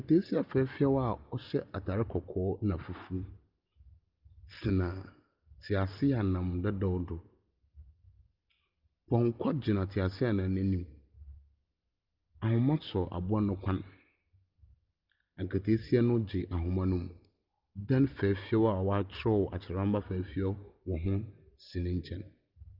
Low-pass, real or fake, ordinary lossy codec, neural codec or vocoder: 5.4 kHz; real; Opus, 24 kbps; none